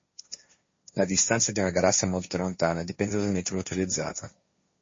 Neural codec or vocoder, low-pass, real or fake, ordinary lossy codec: codec, 16 kHz, 1.1 kbps, Voila-Tokenizer; 7.2 kHz; fake; MP3, 32 kbps